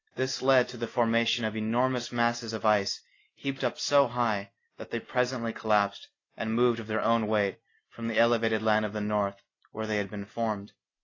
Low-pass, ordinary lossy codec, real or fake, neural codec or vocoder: 7.2 kHz; AAC, 32 kbps; real; none